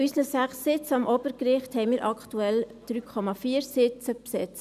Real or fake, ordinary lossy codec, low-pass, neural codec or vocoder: real; none; 14.4 kHz; none